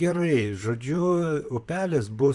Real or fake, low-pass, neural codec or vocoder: fake; 10.8 kHz; vocoder, 44.1 kHz, 128 mel bands, Pupu-Vocoder